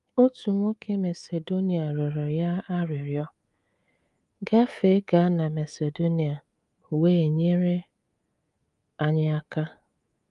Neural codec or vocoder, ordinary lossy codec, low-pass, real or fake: codec, 24 kHz, 3.1 kbps, DualCodec; Opus, 32 kbps; 10.8 kHz; fake